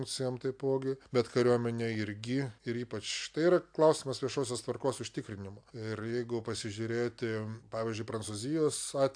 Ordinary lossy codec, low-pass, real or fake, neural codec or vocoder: AAC, 64 kbps; 9.9 kHz; real; none